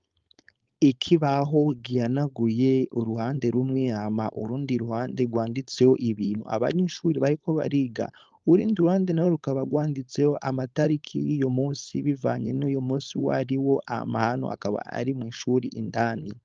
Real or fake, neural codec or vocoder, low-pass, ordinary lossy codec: fake; codec, 16 kHz, 4.8 kbps, FACodec; 7.2 kHz; Opus, 32 kbps